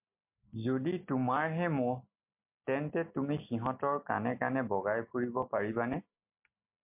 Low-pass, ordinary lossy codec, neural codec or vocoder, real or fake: 3.6 kHz; MP3, 32 kbps; none; real